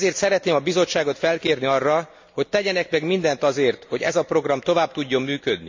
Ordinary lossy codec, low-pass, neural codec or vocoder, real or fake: MP3, 48 kbps; 7.2 kHz; none; real